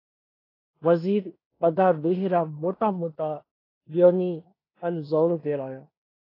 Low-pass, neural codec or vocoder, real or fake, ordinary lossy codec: 5.4 kHz; codec, 16 kHz in and 24 kHz out, 0.9 kbps, LongCat-Audio-Codec, fine tuned four codebook decoder; fake; AAC, 24 kbps